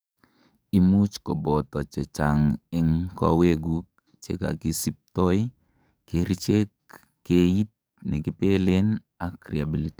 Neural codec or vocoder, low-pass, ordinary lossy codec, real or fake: codec, 44.1 kHz, 7.8 kbps, DAC; none; none; fake